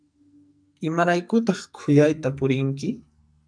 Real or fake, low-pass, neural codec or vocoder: fake; 9.9 kHz; codec, 44.1 kHz, 2.6 kbps, SNAC